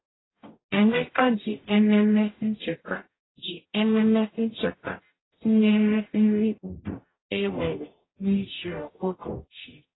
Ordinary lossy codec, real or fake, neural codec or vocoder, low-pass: AAC, 16 kbps; fake; codec, 44.1 kHz, 0.9 kbps, DAC; 7.2 kHz